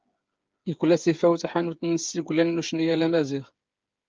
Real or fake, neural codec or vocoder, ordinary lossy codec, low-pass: fake; codec, 16 kHz, 8 kbps, FreqCodec, smaller model; Opus, 24 kbps; 7.2 kHz